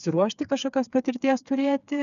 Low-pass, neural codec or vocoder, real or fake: 7.2 kHz; codec, 16 kHz, 4 kbps, FreqCodec, smaller model; fake